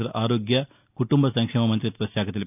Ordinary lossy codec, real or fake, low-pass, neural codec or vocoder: none; real; 3.6 kHz; none